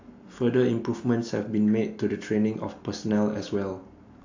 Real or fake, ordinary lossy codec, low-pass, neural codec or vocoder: real; AAC, 48 kbps; 7.2 kHz; none